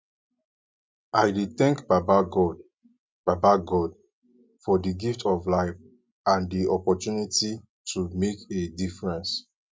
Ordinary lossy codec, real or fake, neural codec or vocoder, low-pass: none; real; none; none